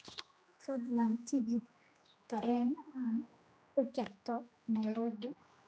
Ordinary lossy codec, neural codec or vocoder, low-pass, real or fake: none; codec, 16 kHz, 1 kbps, X-Codec, HuBERT features, trained on general audio; none; fake